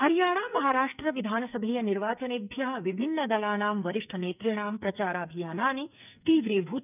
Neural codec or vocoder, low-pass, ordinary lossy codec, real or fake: codec, 44.1 kHz, 2.6 kbps, SNAC; 3.6 kHz; none; fake